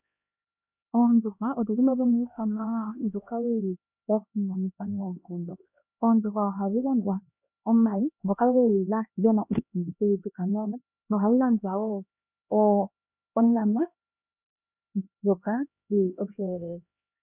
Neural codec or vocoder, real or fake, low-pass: codec, 16 kHz, 1 kbps, X-Codec, HuBERT features, trained on LibriSpeech; fake; 3.6 kHz